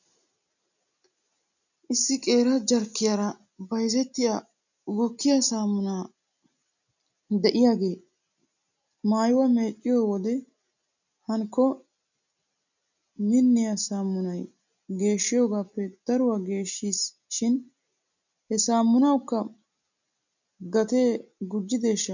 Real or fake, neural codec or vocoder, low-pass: real; none; 7.2 kHz